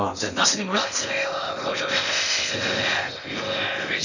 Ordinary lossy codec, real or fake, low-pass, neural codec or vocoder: none; fake; 7.2 kHz; codec, 16 kHz in and 24 kHz out, 0.6 kbps, FocalCodec, streaming, 2048 codes